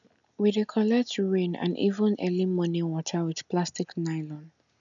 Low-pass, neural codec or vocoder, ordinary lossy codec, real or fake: 7.2 kHz; none; none; real